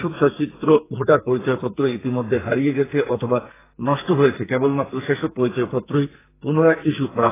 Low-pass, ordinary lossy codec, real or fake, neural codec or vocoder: 3.6 kHz; AAC, 16 kbps; fake; codec, 44.1 kHz, 2.6 kbps, SNAC